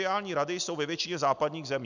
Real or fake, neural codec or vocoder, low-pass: real; none; 7.2 kHz